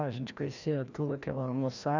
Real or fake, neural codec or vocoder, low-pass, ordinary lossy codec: fake; codec, 16 kHz, 1 kbps, FreqCodec, larger model; 7.2 kHz; none